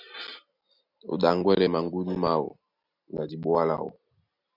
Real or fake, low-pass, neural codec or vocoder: real; 5.4 kHz; none